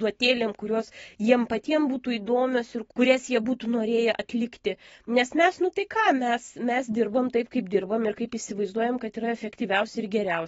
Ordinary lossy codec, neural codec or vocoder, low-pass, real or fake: AAC, 24 kbps; none; 19.8 kHz; real